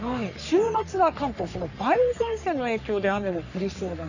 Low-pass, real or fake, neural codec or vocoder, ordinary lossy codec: 7.2 kHz; fake; codec, 44.1 kHz, 3.4 kbps, Pupu-Codec; none